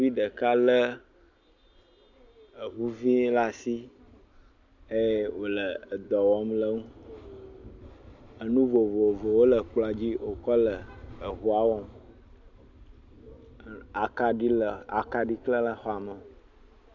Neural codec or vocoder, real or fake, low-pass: none; real; 7.2 kHz